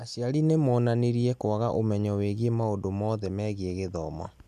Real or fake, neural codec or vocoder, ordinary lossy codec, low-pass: real; none; none; 14.4 kHz